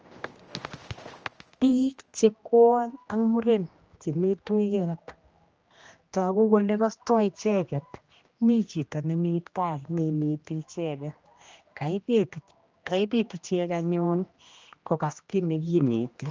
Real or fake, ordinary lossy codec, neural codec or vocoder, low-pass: fake; Opus, 24 kbps; codec, 16 kHz, 1 kbps, X-Codec, HuBERT features, trained on general audio; 7.2 kHz